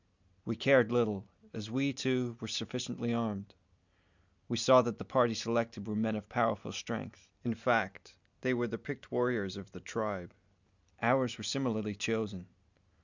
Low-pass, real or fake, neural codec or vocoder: 7.2 kHz; real; none